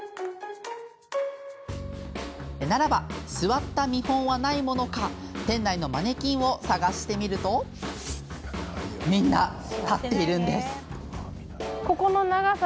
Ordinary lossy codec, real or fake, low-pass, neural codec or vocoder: none; real; none; none